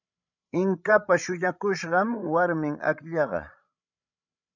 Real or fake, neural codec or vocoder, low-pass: fake; codec, 16 kHz, 16 kbps, FreqCodec, larger model; 7.2 kHz